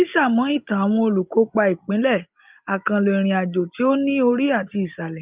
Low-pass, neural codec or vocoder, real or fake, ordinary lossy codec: 3.6 kHz; none; real; Opus, 24 kbps